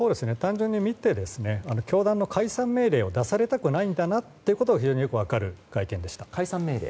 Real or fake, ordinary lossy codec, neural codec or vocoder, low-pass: real; none; none; none